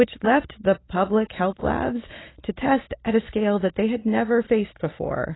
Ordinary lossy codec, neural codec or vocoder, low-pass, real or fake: AAC, 16 kbps; none; 7.2 kHz; real